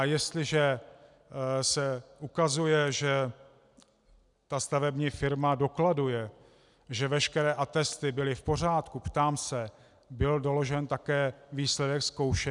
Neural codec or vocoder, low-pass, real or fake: none; 10.8 kHz; real